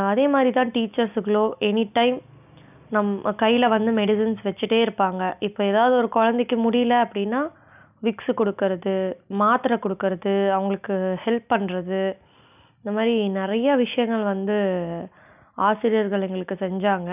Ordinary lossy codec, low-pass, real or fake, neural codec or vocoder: none; 3.6 kHz; real; none